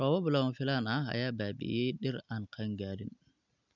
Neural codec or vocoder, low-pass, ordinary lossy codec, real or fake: none; 7.2 kHz; none; real